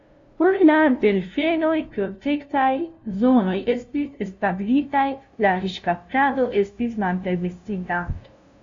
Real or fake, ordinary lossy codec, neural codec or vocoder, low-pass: fake; AAC, 48 kbps; codec, 16 kHz, 0.5 kbps, FunCodec, trained on LibriTTS, 25 frames a second; 7.2 kHz